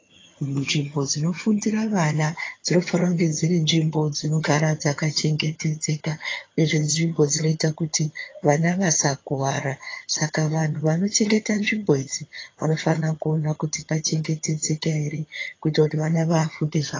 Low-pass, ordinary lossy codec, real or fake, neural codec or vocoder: 7.2 kHz; AAC, 32 kbps; fake; vocoder, 22.05 kHz, 80 mel bands, HiFi-GAN